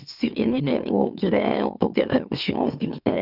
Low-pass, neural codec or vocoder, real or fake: 5.4 kHz; autoencoder, 44.1 kHz, a latent of 192 numbers a frame, MeloTTS; fake